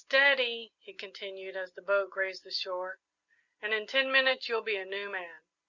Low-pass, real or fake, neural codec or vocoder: 7.2 kHz; real; none